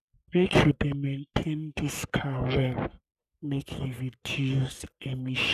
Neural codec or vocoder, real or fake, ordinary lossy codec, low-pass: codec, 44.1 kHz, 3.4 kbps, Pupu-Codec; fake; none; 14.4 kHz